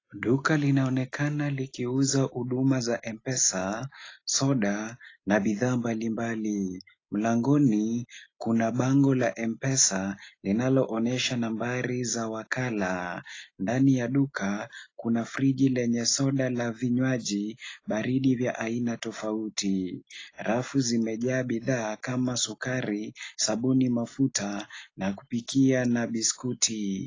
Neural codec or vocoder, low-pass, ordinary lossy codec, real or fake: none; 7.2 kHz; AAC, 32 kbps; real